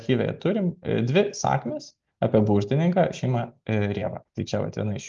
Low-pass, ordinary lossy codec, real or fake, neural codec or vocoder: 7.2 kHz; Opus, 32 kbps; real; none